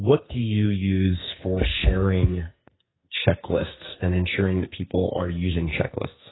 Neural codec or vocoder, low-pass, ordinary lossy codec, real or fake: codec, 44.1 kHz, 2.6 kbps, SNAC; 7.2 kHz; AAC, 16 kbps; fake